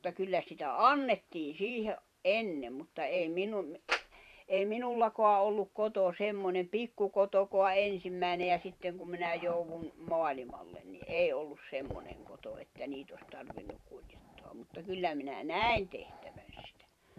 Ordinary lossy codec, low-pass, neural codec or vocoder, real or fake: none; 14.4 kHz; vocoder, 44.1 kHz, 128 mel bands every 512 samples, BigVGAN v2; fake